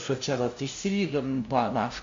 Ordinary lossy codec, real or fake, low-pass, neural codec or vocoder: MP3, 48 kbps; fake; 7.2 kHz; codec, 16 kHz, 0.5 kbps, FunCodec, trained on LibriTTS, 25 frames a second